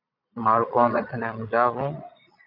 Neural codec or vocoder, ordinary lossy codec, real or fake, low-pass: vocoder, 22.05 kHz, 80 mel bands, Vocos; MP3, 48 kbps; fake; 5.4 kHz